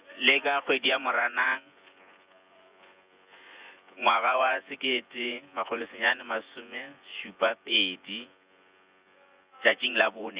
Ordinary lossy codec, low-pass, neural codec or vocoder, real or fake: Opus, 64 kbps; 3.6 kHz; vocoder, 24 kHz, 100 mel bands, Vocos; fake